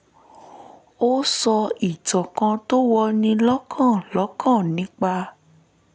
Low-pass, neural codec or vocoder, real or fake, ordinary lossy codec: none; none; real; none